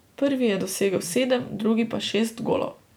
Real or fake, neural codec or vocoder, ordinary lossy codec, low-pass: real; none; none; none